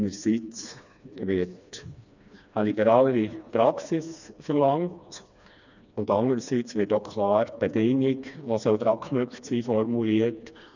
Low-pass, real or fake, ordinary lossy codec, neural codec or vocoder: 7.2 kHz; fake; none; codec, 16 kHz, 2 kbps, FreqCodec, smaller model